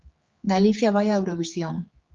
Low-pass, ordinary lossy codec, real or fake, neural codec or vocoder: 7.2 kHz; Opus, 32 kbps; fake; codec, 16 kHz, 4 kbps, X-Codec, HuBERT features, trained on general audio